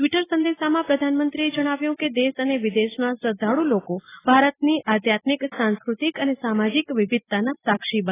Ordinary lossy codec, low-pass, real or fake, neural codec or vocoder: AAC, 16 kbps; 3.6 kHz; real; none